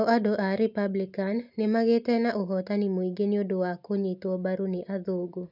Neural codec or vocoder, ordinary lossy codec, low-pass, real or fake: none; none; 5.4 kHz; real